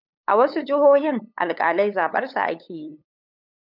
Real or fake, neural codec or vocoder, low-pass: fake; codec, 16 kHz, 8 kbps, FunCodec, trained on LibriTTS, 25 frames a second; 5.4 kHz